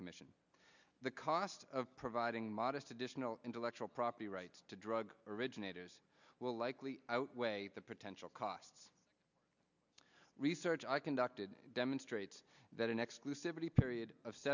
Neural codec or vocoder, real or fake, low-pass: none; real; 7.2 kHz